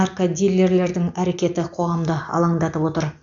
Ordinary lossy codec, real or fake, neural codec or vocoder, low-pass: AAC, 64 kbps; real; none; 7.2 kHz